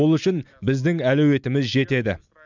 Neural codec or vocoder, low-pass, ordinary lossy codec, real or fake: none; 7.2 kHz; none; real